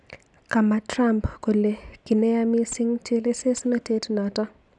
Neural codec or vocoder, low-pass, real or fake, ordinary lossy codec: none; 10.8 kHz; real; none